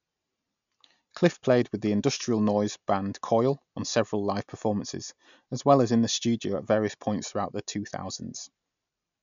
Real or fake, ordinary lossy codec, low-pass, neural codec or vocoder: real; none; 7.2 kHz; none